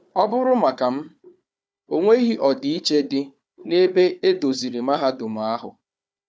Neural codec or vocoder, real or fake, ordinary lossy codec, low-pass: codec, 16 kHz, 4 kbps, FunCodec, trained on Chinese and English, 50 frames a second; fake; none; none